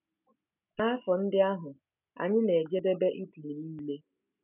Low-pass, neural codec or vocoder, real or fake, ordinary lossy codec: 3.6 kHz; none; real; none